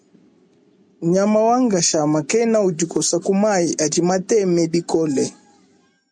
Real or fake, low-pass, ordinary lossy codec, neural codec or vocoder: real; 9.9 kHz; MP3, 96 kbps; none